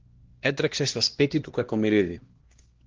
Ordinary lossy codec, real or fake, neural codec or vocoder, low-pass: Opus, 16 kbps; fake; codec, 16 kHz, 1 kbps, X-Codec, HuBERT features, trained on LibriSpeech; 7.2 kHz